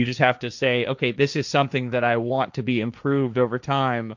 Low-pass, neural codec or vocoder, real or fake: 7.2 kHz; codec, 16 kHz, 1.1 kbps, Voila-Tokenizer; fake